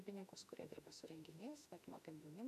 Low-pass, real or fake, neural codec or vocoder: 14.4 kHz; fake; autoencoder, 48 kHz, 32 numbers a frame, DAC-VAE, trained on Japanese speech